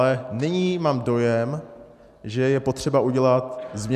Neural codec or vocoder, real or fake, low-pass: none; real; 14.4 kHz